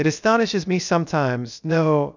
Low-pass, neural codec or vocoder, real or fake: 7.2 kHz; codec, 16 kHz, 0.3 kbps, FocalCodec; fake